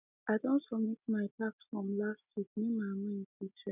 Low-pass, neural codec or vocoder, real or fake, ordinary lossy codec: 3.6 kHz; none; real; none